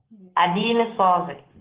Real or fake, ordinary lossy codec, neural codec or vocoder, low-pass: real; Opus, 16 kbps; none; 3.6 kHz